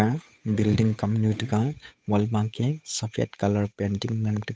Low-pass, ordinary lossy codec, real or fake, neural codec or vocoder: none; none; fake; codec, 16 kHz, 8 kbps, FunCodec, trained on Chinese and English, 25 frames a second